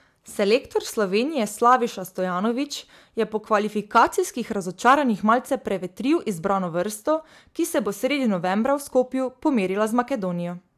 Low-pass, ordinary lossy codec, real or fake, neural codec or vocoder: 14.4 kHz; AAC, 96 kbps; real; none